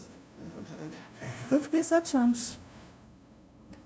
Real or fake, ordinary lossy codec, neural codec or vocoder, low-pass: fake; none; codec, 16 kHz, 0.5 kbps, FunCodec, trained on LibriTTS, 25 frames a second; none